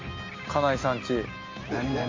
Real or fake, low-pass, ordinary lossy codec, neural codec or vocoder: real; 7.2 kHz; Opus, 32 kbps; none